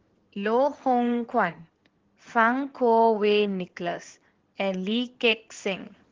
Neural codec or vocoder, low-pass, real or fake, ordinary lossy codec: codec, 16 kHz in and 24 kHz out, 2.2 kbps, FireRedTTS-2 codec; 7.2 kHz; fake; Opus, 16 kbps